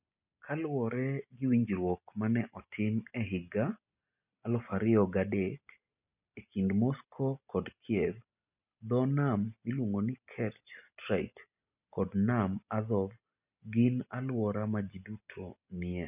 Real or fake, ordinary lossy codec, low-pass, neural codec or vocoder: real; none; 3.6 kHz; none